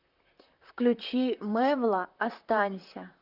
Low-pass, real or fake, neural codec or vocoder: 5.4 kHz; fake; vocoder, 44.1 kHz, 128 mel bands, Pupu-Vocoder